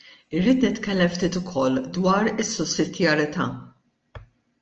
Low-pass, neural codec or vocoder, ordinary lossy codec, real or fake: 7.2 kHz; none; Opus, 24 kbps; real